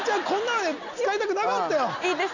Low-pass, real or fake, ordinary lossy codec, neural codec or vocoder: 7.2 kHz; real; none; none